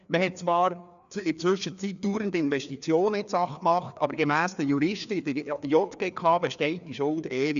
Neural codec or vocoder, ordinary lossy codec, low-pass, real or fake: codec, 16 kHz, 2 kbps, FreqCodec, larger model; none; 7.2 kHz; fake